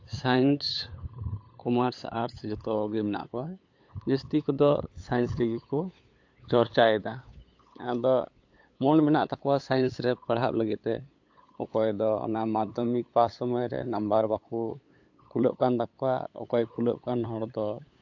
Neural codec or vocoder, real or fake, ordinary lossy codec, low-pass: codec, 16 kHz, 8 kbps, FunCodec, trained on LibriTTS, 25 frames a second; fake; AAC, 48 kbps; 7.2 kHz